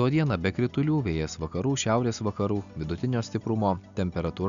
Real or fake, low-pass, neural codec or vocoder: real; 7.2 kHz; none